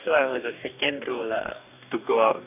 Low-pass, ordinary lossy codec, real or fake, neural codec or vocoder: 3.6 kHz; none; fake; codec, 44.1 kHz, 2.6 kbps, DAC